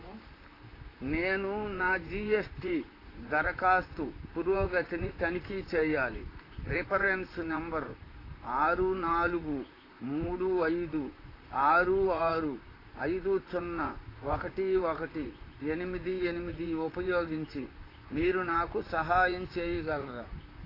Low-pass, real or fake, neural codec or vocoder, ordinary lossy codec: 5.4 kHz; fake; vocoder, 44.1 kHz, 128 mel bands, Pupu-Vocoder; AAC, 24 kbps